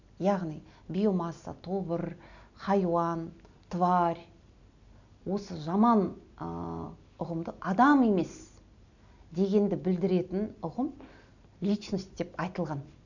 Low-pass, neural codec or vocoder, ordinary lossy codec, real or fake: 7.2 kHz; none; none; real